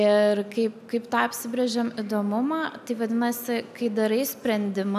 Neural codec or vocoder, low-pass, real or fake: none; 14.4 kHz; real